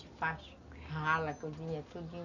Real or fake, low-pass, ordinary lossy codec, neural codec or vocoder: real; 7.2 kHz; none; none